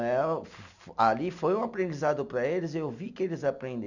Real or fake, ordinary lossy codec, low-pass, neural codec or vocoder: real; none; 7.2 kHz; none